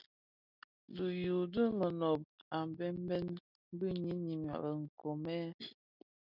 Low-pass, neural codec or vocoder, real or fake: 5.4 kHz; none; real